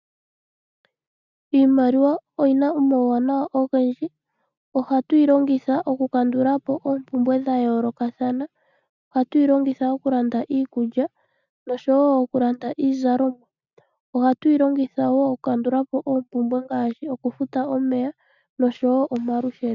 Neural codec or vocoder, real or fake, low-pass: none; real; 7.2 kHz